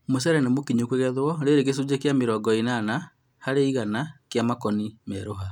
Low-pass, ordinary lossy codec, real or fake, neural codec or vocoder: 19.8 kHz; none; real; none